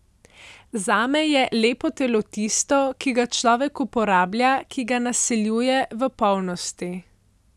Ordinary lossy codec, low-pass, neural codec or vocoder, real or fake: none; none; none; real